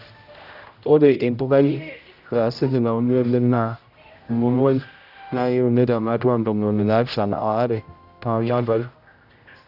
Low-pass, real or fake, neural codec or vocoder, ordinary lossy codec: 5.4 kHz; fake; codec, 16 kHz, 0.5 kbps, X-Codec, HuBERT features, trained on general audio; none